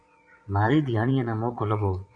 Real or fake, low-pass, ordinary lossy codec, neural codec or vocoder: fake; 9.9 kHz; MP3, 96 kbps; vocoder, 22.05 kHz, 80 mel bands, Vocos